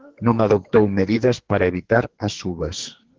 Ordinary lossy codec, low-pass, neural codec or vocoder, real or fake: Opus, 16 kbps; 7.2 kHz; codec, 44.1 kHz, 2.6 kbps, SNAC; fake